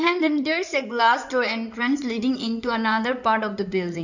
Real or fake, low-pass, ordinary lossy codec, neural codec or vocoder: fake; 7.2 kHz; none; codec, 16 kHz in and 24 kHz out, 2.2 kbps, FireRedTTS-2 codec